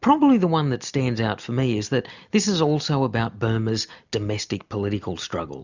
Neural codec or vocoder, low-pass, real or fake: none; 7.2 kHz; real